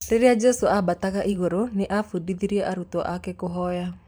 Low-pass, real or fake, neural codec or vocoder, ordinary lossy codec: none; fake; vocoder, 44.1 kHz, 128 mel bands every 512 samples, BigVGAN v2; none